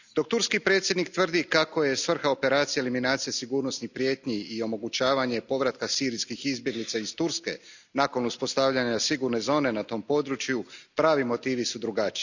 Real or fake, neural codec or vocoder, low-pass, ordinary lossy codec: real; none; 7.2 kHz; none